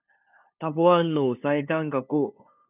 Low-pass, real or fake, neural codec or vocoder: 3.6 kHz; fake; codec, 16 kHz, 2 kbps, FunCodec, trained on LibriTTS, 25 frames a second